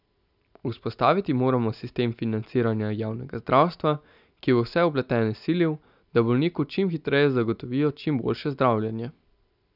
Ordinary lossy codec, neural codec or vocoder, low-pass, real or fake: none; none; 5.4 kHz; real